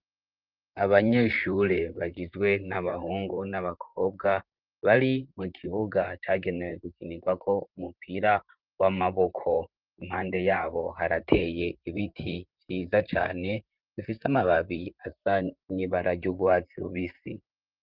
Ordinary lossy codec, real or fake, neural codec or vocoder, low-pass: Opus, 32 kbps; fake; vocoder, 44.1 kHz, 128 mel bands, Pupu-Vocoder; 5.4 kHz